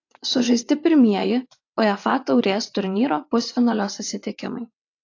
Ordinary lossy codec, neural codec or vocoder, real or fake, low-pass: AAC, 48 kbps; vocoder, 22.05 kHz, 80 mel bands, WaveNeXt; fake; 7.2 kHz